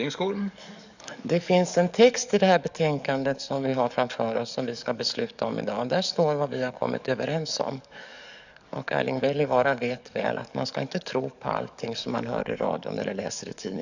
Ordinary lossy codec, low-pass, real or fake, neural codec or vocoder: none; 7.2 kHz; fake; codec, 44.1 kHz, 7.8 kbps, DAC